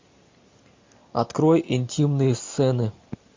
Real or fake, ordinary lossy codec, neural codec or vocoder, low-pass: real; MP3, 48 kbps; none; 7.2 kHz